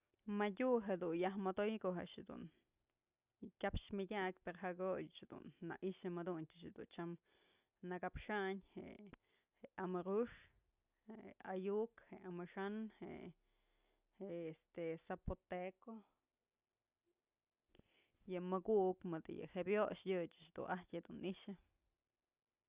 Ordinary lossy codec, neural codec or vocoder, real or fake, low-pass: Opus, 64 kbps; none; real; 3.6 kHz